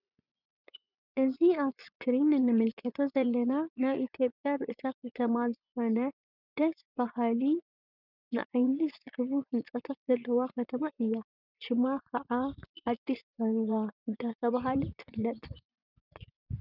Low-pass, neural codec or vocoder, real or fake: 5.4 kHz; vocoder, 24 kHz, 100 mel bands, Vocos; fake